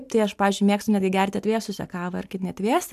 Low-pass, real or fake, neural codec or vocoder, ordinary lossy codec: 14.4 kHz; real; none; MP3, 96 kbps